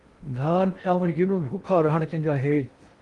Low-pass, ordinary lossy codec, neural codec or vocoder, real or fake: 10.8 kHz; Opus, 24 kbps; codec, 16 kHz in and 24 kHz out, 0.6 kbps, FocalCodec, streaming, 2048 codes; fake